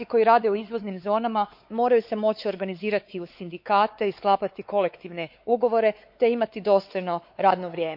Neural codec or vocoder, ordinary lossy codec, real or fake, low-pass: codec, 16 kHz, 4 kbps, X-Codec, WavLM features, trained on Multilingual LibriSpeech; MP3, 48 kbps; fake; 5.4 kHz